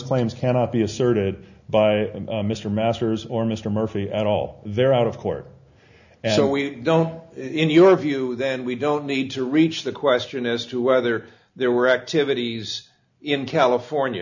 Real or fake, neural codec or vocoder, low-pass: real; none; 7.2 kHz